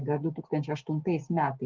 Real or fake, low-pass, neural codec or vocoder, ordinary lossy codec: real; 7.2 kHz; none; Opus, 16 kbps